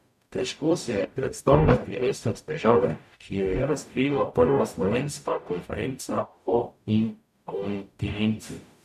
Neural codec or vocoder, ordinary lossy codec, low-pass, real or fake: codec, 44.1 kHz, 0.9 kbps, DAC; none; 14.4 kHz; fake